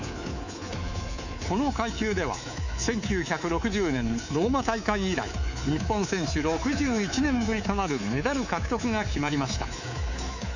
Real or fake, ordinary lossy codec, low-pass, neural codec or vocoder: fake; none; 7.2 kHz; codec, 24 kHz, 3.1 kbps, DualCodec